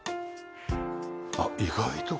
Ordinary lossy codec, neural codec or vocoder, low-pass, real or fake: none; none; none; real